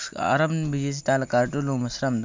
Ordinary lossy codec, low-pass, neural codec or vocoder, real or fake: MP3, 64 kbps; 7.2 kHz; none; real